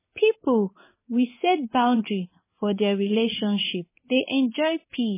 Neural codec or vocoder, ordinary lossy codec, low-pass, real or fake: codec, 16 kHz in and 24 kHz out, 1 kbps, XY-Tokenizer; MP3, 16 kbps; 3.6 kHz; fake